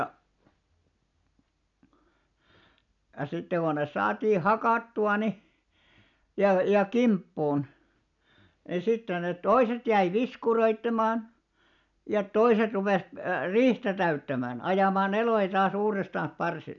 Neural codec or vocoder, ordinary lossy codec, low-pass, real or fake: none; none; 7.2 kHz; real